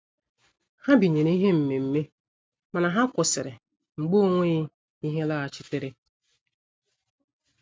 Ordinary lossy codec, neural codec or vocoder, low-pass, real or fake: none; none; none; real